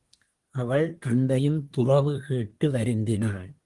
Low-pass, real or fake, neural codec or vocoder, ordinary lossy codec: 10.8 kHz; fake; codec, 24 kHz, 1 kbps, SNAC; Opus, 24 kbps